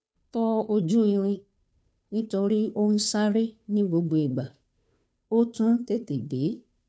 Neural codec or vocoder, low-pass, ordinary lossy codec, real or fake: codec, 16 kHz, 2 kbps, FunCodec, trained on Chinese and English, 25 frames a second; none; none; fake